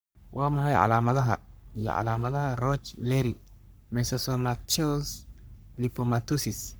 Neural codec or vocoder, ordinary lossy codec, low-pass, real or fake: codec, 44.1 kHz, 3.4 kbps, Pupu-Codec; none; none; fake